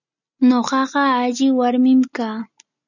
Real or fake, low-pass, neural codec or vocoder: real; 7.2 kHz; none